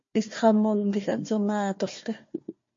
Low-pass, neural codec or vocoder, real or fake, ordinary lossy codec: 7.2 kHz; codec, 16 kHz, 1 kbps, FunCodec, trained on Chinese and English, 50 frames a second; fake; MP3, 32 kbps